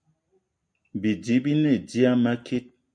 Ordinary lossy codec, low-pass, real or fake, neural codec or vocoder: MP3, 64 kbps; 9.9 kHz; real; none